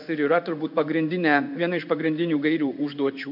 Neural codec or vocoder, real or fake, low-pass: codec, 16 kHz in and 24 kHz out, 1 kbps, XY-Tokenizer; fake; 5.4 kHz